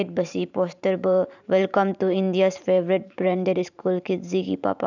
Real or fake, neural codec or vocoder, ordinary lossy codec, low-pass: fake; vocoder, 44.1 kHz, 128 mel bands every 512 samples, BigVGAN v2; none; 7.2 kHz